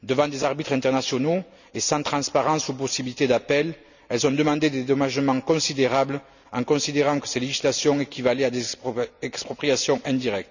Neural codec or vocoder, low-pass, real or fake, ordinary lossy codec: none; 7.2 kHz; real; none